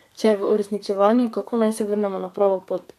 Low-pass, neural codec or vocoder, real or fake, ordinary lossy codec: 14.4 kHz; codec, 32 kHz, 1.9 kbps, SNAC; fake; MP3, 96 kbps